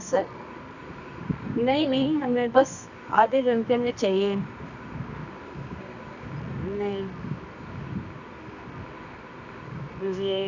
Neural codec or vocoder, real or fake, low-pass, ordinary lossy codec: codec, 24 kHz, 0.9 kbps, WavTokenizer, medium music audio release; fake; 7.2 kHz; none